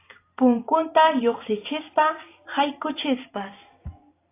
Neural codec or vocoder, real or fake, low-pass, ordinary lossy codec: vocoder, 44.1 kHz, 128 mel bands every 512 samples, BigVGAN v2; fake; 3.6 kHz; AAC, 24 kbps